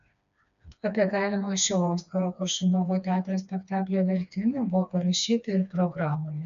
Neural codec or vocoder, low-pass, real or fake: codec, 16 kHz, 2 kbps, FreqCodec, smaller model; 7.2 kHz; fake